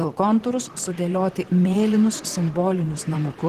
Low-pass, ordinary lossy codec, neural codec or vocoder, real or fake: 14.4 kHz; Opus, 16 kbps; vocoder, 44.1 kHz, 128 mel bands, Pupu-Vocoder; fake